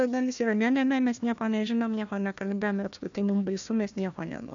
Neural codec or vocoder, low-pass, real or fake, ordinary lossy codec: codec, 16 kHz, 1 kbps, FunCodec, trained on Chinese and English, 50 frames a second; 7.2 kHz; fake; AAC, 64 kbps